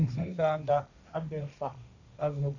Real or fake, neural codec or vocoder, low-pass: fake; codec, 16 kHz, 1.1 kbps, Voila-Tokenizer; 7.2 kHz